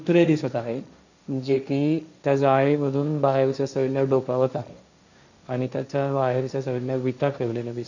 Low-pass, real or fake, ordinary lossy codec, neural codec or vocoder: 7.2 kHz; fake; none; codec, 16 kHz, 1.1 kbps, Voila-Tokenizer